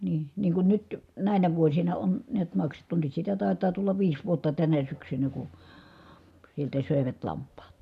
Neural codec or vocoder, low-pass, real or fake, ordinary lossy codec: none; 14.4 kHz; real; none